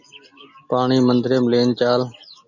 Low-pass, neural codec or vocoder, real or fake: 7.2 kHz; none; real